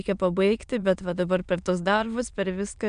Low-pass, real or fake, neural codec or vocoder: 9.9 kHz; fake; autoencoder, 22.05 kHz, a latent of 192 numbers a frame, VITS, trained on many speakers